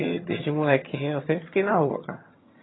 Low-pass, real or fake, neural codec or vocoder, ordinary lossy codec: 7.2 kHz; fake; vocoder, 22.05 kHz, 80 mel bands, HiFi-GAN; AAC, 16 kbps